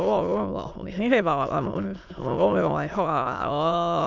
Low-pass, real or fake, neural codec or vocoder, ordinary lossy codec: 7.2 kHz; fake; autoencoder, 22.05 kHz, a latent of 192 numbers a frame, VITS, trained on many speakers; none